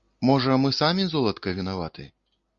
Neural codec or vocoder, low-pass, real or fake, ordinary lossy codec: none; 7.2 kHz; real; Opus, 64 kbps